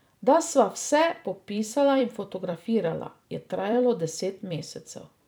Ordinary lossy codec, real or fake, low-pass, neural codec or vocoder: none; fake; none; vocoder, 44.1 kHz, 128 mel bands every 256 samples, BigVGAN v2